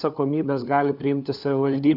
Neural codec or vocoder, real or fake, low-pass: codec, 16 kHz, 4 kbps, FunCodec, trained on LibriTTS, 50 frames a second; fake; 5.4 kHz